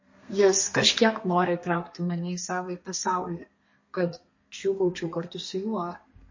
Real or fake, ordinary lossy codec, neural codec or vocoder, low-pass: fake; MP3, 32 kbps; codec, 32 kHz, 1.9 kbps, SNAC; 7.2 kHz